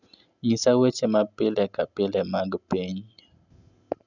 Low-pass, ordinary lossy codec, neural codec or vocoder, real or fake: 7.2 kHz; none; none; real